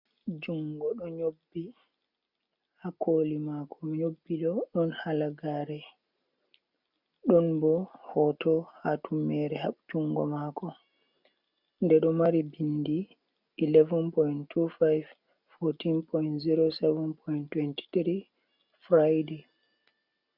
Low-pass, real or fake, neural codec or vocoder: 5.4 kHz; real; none